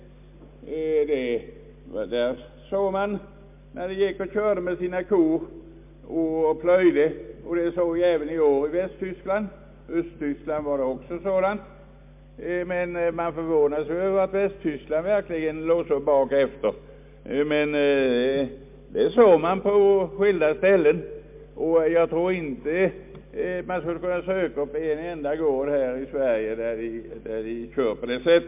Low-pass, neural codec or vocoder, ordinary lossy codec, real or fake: 3.6 kHz; none; MP3, 32 kbps; real